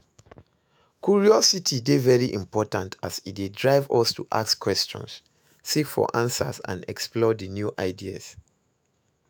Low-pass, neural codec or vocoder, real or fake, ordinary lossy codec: none; autoencoder, 48 kHz, 128 numbers a frame, DAC-VAE, trained on Japanese speech; fake; none